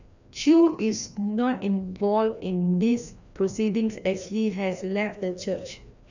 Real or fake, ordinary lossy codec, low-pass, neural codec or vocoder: fake; none; 7.2 kHz; codec, 16 kHz, 1 kbps, FreqCodec, larger model